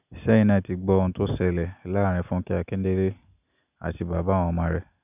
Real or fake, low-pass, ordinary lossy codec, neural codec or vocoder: real; 3.6 kHz; none; none